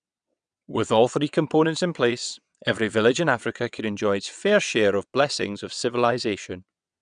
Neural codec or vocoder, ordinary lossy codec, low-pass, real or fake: vocoder, 22.05 kHz, 80 mel bands, WaveNeXt; none; 9.9 kHz; fake